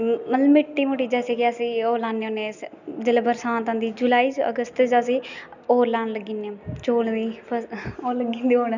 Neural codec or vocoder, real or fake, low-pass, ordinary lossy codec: none; real; 7.2 kHz; none